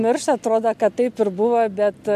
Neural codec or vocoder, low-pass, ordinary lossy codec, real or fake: none; 14.4 kHz; MP3, 96 kbps; real